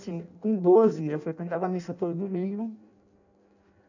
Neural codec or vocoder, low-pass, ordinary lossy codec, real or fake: codec, 16 kHz in and 24 kHz out, 0.6 kbps, FireRedTTS-2 codec; 7.2 kHz; none; fake